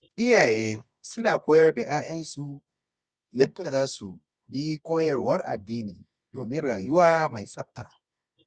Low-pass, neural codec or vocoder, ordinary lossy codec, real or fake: 9.9 kHz; codec, 24 kHz, 0.9 kbps, WavTokenizer, medium music audio release; Opus, 64 kbps; fake